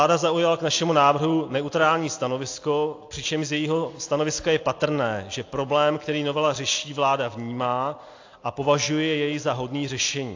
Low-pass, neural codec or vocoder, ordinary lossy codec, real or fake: 7.2 kHz; none; AAC, 48 kbps; real